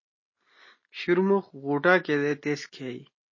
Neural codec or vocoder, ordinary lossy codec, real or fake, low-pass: none; MP3, 32 kbps; real; 7.2 kHz